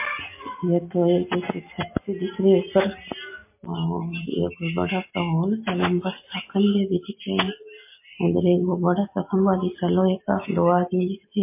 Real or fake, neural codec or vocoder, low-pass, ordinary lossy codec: real; none; 3.6 kHz; MP3, 24 kbps